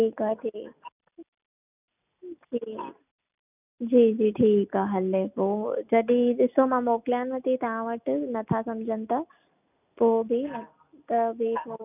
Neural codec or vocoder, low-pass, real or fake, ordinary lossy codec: none; 3.6 kHz; real; none